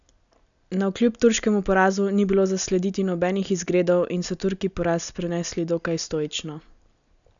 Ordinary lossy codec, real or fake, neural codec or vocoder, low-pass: none; real; none; 7.2 kHz